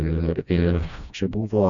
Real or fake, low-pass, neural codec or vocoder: fake; 7.2 kHz; codec, 16 kHz, 1 kbps, FreqCodec, smaller model